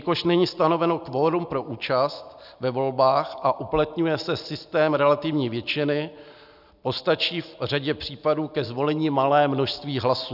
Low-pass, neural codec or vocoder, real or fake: 5.4 kHz; none; real